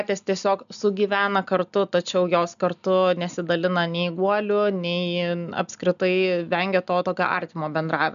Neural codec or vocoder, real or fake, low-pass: none; real; 7.2 kHz